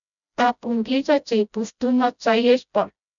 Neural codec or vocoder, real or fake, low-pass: codec, 16 kHz, 0.5 kbps, FreqCodec, smaller model; fake; 7.2 kHz